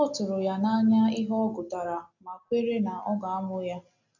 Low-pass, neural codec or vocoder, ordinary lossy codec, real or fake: 7.2 kHz; none; none; real